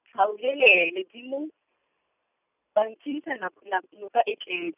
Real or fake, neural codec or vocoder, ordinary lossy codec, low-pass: real; none; none; 3.6 kHz